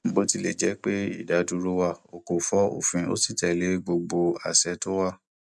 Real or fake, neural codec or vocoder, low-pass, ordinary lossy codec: real; none; none; none